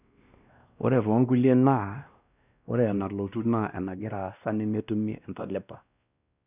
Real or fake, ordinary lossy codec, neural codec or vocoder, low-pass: fake; none; codec, 16 kHz, 1 kbps, X-Codec, WavLM features, trained on Multilingual LibriSpeech; 3.6 kHz